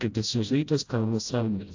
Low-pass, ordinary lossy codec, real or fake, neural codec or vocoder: 7.2 kHz; AAC, 48 kbps; fake; codec, 16 kHz, 0.5 kbps, FreqCodec, smaller model